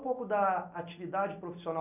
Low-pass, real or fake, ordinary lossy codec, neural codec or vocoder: 3.6 kHz; real; none; none